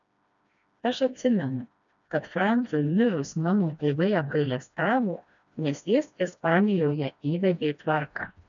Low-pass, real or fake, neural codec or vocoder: 7.2 kHz; fake; codec, 16 kHz, 1 kbps, FreqCodec, smaller model